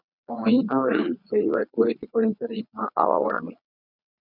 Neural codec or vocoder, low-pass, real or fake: vocoder, 22.05 kHz, 80 mel bands, Vocos; 5.4 kHz; fake